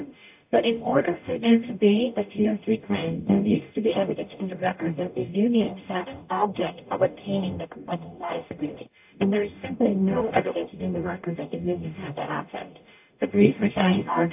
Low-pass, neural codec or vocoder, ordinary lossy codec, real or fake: 3.6 kHz; codec, 44.1 kHz, 0.9 kbps, DAC; AAC, 32 kbps; fake